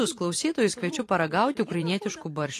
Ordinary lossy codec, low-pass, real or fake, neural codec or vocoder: AAC, 48 kbps; 14.4 kHz; fake; vocoder, 44.1 kHz, 128 mel bands every 512 samples, BigVGAN v2